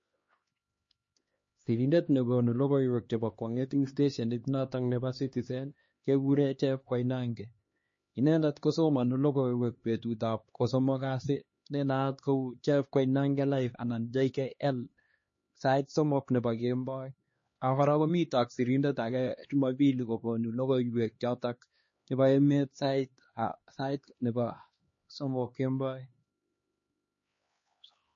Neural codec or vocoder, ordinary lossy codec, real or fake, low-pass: codec, 16 kHz, 2 kbps, X-Codec, HuBERT features, trained on LibriSpeech; MP3, 32 kbps; fake; 7.2 kHz